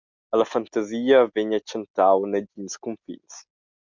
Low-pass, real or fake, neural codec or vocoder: 7.2 kHz; real; none